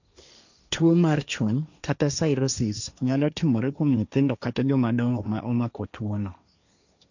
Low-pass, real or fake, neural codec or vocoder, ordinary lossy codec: 7.2 kHz; fake; codec, 16 kHz, 1.1 kbps, Voila-Tokenizer; none